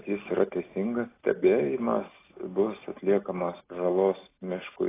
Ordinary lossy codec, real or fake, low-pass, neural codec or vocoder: AAC, 16 kbps; real; 3.6 kHz; none